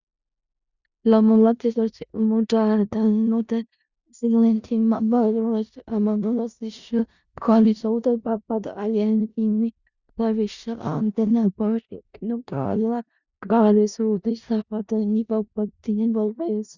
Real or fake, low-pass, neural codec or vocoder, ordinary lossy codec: fake; 7.2 kHz; codec, 16 kHz in and 24 kHz out, 0.4 kbps, LongCat-Audio-Codec, four codebook decoder; Opus, 64 kbps